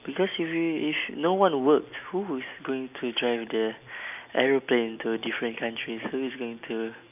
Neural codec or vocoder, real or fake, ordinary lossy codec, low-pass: none; real; none; 3.6 kHz